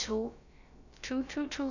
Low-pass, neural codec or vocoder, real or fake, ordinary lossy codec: 7.2 kHz; codec, 16 kHz, about 1 kbps, DyCAST, with the encoder's durations; fake; none